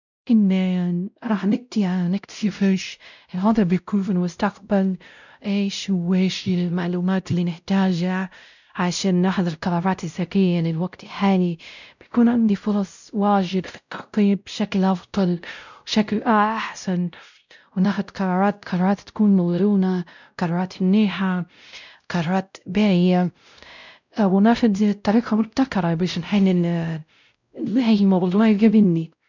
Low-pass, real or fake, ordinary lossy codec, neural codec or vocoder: 7.2 kHz; fake; none; codec, 16 kHz, 0.5 kbps, X-Codec, WavLM features, trained on Multilingual LibriSpeech